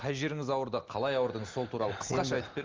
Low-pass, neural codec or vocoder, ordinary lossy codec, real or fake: 7.2 kHz; none; Opus, 32 kbps; real